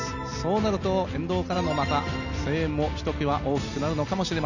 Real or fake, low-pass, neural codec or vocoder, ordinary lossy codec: real; 7.2 kHz; none; none